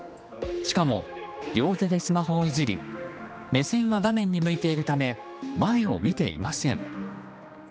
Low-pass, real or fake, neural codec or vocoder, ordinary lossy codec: none; fake; codec, 16 kHz, 2 kbps, X-Codec, HuBERT features, trained on general audio; none